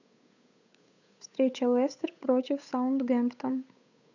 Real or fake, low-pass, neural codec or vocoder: fake; 7.2 kHz; codec, 16 kHz, 8 kbps, FunCodec, trained on Chinese and English, 25 frames a second